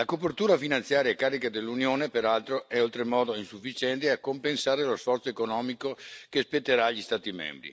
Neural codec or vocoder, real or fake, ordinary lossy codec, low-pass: none; real; none; none